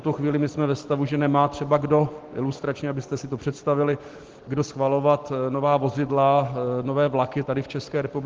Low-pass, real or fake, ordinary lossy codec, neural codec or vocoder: 7.2 kHz; real; Opus, 16 kbps; none